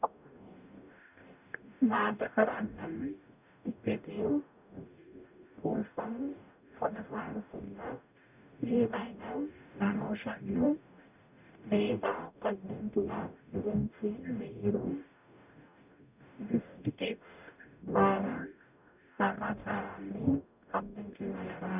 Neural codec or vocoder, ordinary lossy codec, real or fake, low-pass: codec, 44.1 kHz, 0.9 kbps, DAC; none; fake; 3.6 kHz